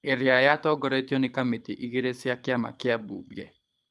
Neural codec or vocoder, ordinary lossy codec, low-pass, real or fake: codec, 24 kHz, 6 kbps, HILCodec; none; none; fake